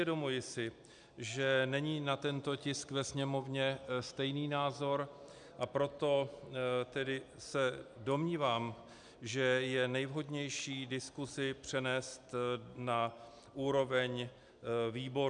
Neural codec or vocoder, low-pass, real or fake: none; 9.9 kHz; real